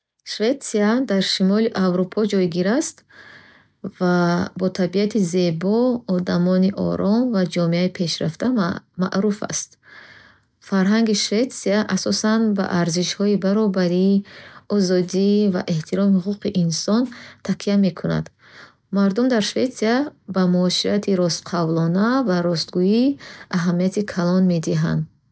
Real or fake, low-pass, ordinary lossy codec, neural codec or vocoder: real; none; none; none